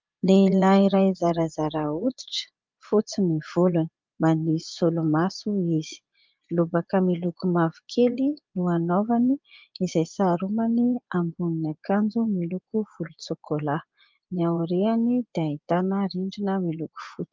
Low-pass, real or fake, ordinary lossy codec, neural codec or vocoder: 7.2 kHz; fake; Opus, 24 kbps; vocoder, 24 kHz, 100 mel bands, Vocos